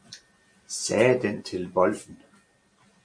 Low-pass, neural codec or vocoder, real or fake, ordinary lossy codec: 9.9 kHz; none; real; MP3, 48 kbps